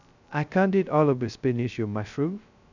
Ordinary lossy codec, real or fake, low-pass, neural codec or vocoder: none; fake; 7.2 kHz; codec, 16 kHz, 0.2 kbps, FocalCodec